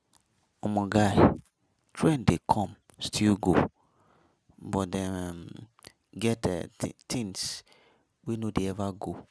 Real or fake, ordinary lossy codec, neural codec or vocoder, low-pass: real; none; none; none